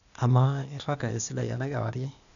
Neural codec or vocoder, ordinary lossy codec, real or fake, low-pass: codec, 16 kHz, 0.8 kbps, ZipCodec; none; fake; 7.2 kHz